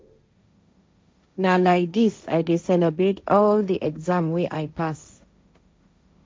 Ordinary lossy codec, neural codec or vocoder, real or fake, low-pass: none; codec, 16 kHz, 1.1 kbps, Voila-Tokenizer; fake; none